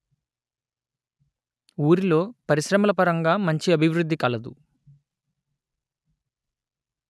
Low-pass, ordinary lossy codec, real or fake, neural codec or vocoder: none; none; real; none